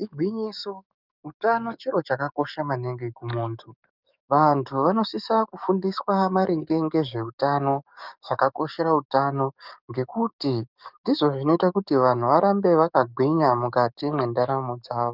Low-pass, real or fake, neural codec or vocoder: 5.4 kHz; fake; codec, 44.1 kHz, 7.8 kbps, DAC